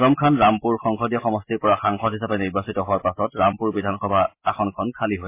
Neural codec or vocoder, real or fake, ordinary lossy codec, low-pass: none; real; MP3, 24 kbps; 3.6 kHz